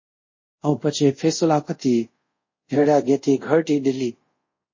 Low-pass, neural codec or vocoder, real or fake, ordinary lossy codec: 7.2 kHz; codec, 24 kHz, 0.5 kbps, DualCodec; fake; MP3, 32 kbps